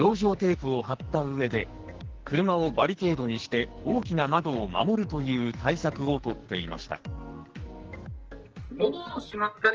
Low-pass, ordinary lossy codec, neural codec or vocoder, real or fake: 7.2 kHz; Opus, 16 kbps; codec, 44.1 kHz, 2.6 kbps, SNAC; fake